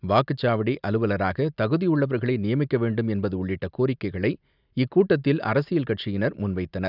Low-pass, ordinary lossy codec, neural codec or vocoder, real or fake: 5.4 kHz; none; none; real